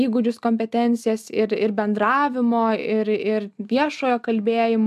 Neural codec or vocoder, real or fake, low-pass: none; real; 14.4 kHz